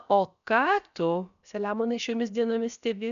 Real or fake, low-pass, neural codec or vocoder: fake; 7.2 kHz; codec, 16 kHz, about 1 kbps, DyCAST, with the encoder's durations